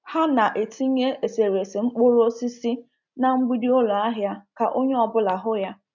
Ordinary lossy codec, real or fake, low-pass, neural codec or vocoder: none; real; 7.2 kHz; none